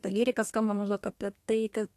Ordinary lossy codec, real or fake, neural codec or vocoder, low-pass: AAC, 64 kbps; fake; codec, 32 kHz, 1.9 kbps, SNAC; 14.4 kHz